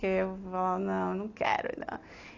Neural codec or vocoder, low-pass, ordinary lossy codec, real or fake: none; 7.2 kHz; AAC, 32 kbps; real